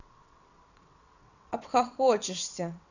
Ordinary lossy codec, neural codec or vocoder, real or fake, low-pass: none; vocoder, 22.05 kHz, 80 mel bands, WaveNeXt; fake; 7.2 kHz